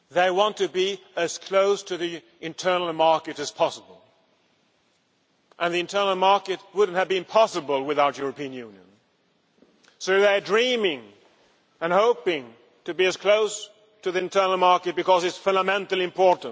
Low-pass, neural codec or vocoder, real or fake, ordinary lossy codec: none; none; real; none